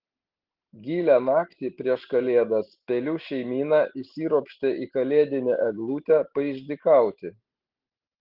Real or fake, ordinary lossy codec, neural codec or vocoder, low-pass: real; Opus, 16 kbps; none; 5.4 kHz